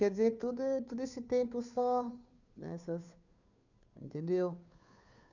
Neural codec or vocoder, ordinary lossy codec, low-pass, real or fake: codec, 16 kHz, 2 kbps, FunCodec, trained on Chinese and English, 25 frames a second; none; 7.2 kHz; fake